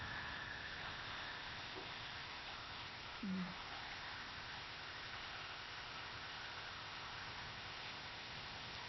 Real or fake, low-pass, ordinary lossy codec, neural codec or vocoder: fake; 7.2 kHz; MP3, 24 kbps; codec, 16 kHz, 0.8 kbps, ZipCodec